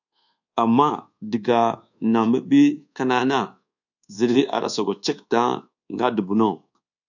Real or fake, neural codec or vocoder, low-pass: fake; codec, 24 kHz, 1.2 kbps, DualCodec; 7.2 kHz